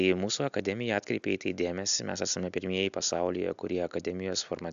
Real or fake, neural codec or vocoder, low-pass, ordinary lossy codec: real; none; 7.2 kHz; AAC, 96 kbps